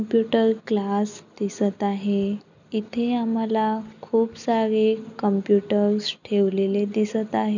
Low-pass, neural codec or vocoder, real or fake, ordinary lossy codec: 7.2 kHz; none; real; none